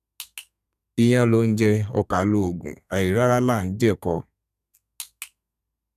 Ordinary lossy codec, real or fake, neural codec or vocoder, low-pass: none; fake; codec, 32 kHz, 1.9 kbps, SNAC; 14.4 kHz